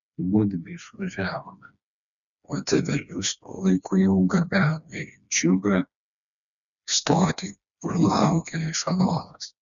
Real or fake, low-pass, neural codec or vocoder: fake; 7.2 kHz; codec, 16 kHz, 2 kbps, FreqCodec, smaller model